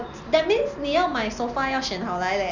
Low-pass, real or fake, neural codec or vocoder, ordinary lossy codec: 7.2 kHz; real; none; none